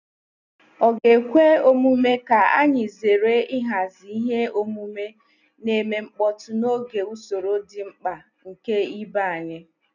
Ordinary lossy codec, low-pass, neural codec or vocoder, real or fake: none; 7.2 kHz; none; real